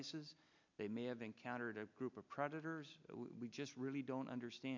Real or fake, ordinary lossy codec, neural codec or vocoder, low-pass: real; MP3, 64 kbps; none; 7.2 kHz